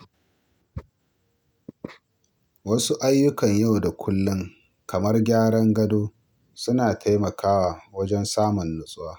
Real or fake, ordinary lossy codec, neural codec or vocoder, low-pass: real; none; none; none